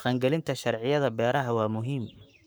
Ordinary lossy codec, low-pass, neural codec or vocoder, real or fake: none; none; codec, 44.1 kHz, 7.8 kbps, Pupu-Codec; fake